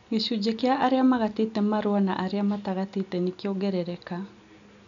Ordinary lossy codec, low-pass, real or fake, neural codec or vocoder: none; 7.2 kHz; real; none